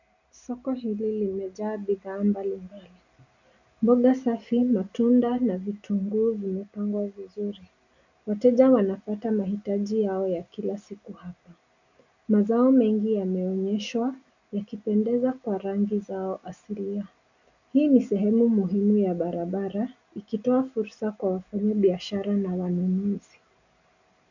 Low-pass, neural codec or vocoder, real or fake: 7.2 kHz; none; real